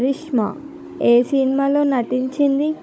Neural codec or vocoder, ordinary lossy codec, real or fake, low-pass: codec, 16 kHz, 16 kbps, FunCodec, trained on Chinese and English, 50 frames a second; none; fake; none